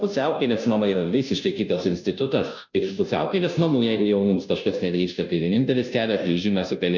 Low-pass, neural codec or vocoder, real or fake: 7.2 kHz; codec, 16 kHz, 0.5 kbps, FunCodec, trained on Chinese and English, 25 frames a second; fake